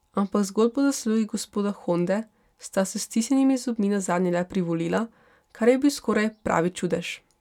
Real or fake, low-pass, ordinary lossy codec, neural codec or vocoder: real; 19.8 kHz; none; none